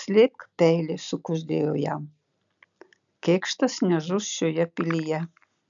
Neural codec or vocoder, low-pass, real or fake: none; 7.2 kHz; real